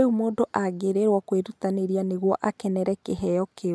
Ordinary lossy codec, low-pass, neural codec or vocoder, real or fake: none; none; none; real